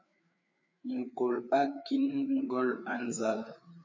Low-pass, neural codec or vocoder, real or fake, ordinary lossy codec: 7.2 kHz; codec, 16 kHz, 4 kbps, FreqCodec, larger model; fake; MP3, 64 kbps